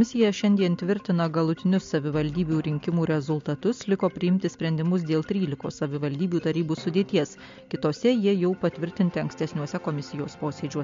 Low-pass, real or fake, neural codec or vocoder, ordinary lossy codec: 7.2 kHz; real; none; MP3, 48 kbps